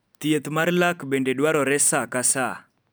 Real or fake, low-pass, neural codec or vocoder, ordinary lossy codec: real; none; none; none